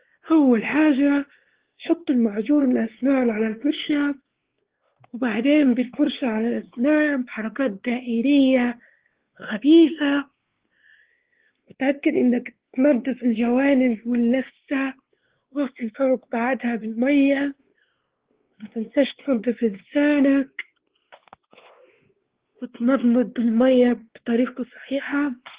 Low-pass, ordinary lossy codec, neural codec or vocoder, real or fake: 3.6 kHz; Opus, 16 kbps; codec, 16 kHz, 2 kbps, X-Codec, WavLM features, trained on Multilingual LibriSpeech; fake